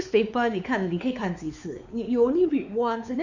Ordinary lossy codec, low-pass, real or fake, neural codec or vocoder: none; 7.2 kHz; fake; codec, 16 kHz, 4 kbps, X-Codec, WavLM features, trained on Multilingual LibriSpeech